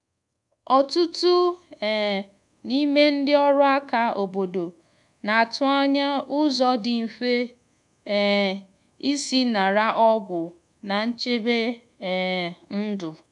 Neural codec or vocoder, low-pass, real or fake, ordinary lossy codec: codec, 24 kHz, 1.2 kbps, DualCodec; 10.8 kHz; fake; none